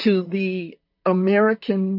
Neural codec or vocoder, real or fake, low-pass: vocoder, 44.1 kHz, 128 mel bands, Pupu-Vocoder; fake; 5.4 kHz